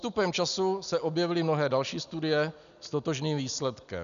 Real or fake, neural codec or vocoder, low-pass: real; none; 7.2 kHz